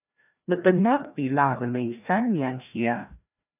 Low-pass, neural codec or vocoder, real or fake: 3.6 kHz; codec, 16 kHz, 1 kbps, FreqCodec, larger model; fake